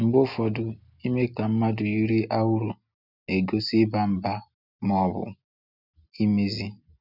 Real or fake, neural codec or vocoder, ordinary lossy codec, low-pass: real; none; none; 5.4 kHz